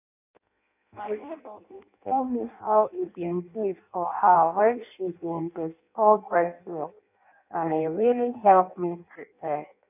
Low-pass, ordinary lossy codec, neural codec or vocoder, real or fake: 3.6 kHz; none; codec, 16 kHz in and 24 kHz out, 0.6 kbps, FireRedTTS-2 codec; fake